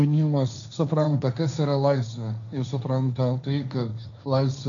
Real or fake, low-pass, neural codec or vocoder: fake; 7.2 kHz; codec, 16 kHz, 1.1 kbps, Voila-Tokenizer